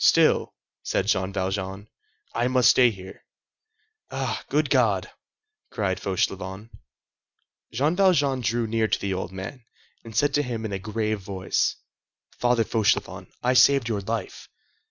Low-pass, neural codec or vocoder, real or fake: 7.2 kHz; none; real